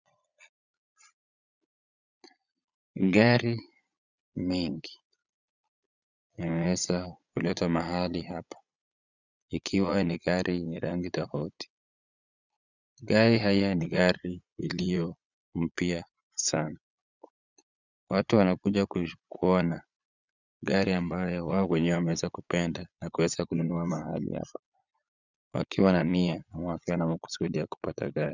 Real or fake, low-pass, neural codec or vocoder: fake; 7.2 kHz; vocoder, 22.05 kHz, 80 mel bands, Vocos